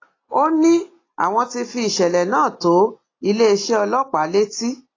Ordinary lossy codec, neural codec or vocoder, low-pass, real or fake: AAC, 32 kbps; none; 7.2 kHz; real